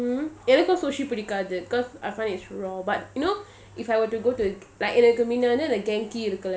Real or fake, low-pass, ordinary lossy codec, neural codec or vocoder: real; none; none; none